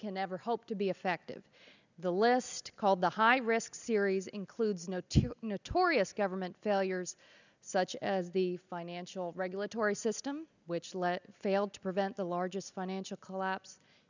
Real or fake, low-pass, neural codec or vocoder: real; 7.2 kHz; none